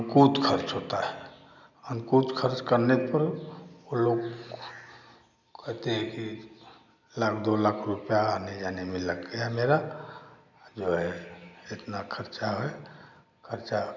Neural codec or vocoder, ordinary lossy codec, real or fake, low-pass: none; none; real; 7.2 kHz